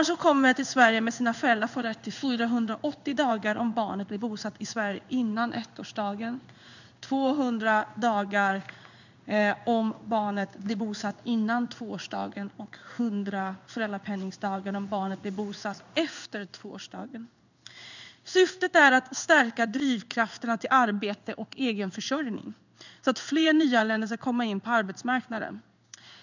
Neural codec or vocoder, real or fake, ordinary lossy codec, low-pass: codec, 16 kHz in and 24 kHz out, 1 kbps, XY-Tokenizer; fake; none; 7.2 kHz